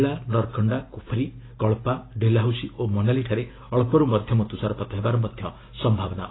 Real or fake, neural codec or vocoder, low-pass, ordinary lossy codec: real; none; 7.2 kHz; AAC, 16 kbps